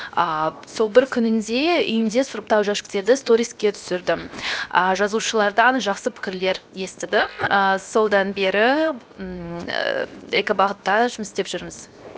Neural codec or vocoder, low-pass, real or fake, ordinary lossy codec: codec, 16 kHz, 0.7 kbps, FocalCodec; none; fake; none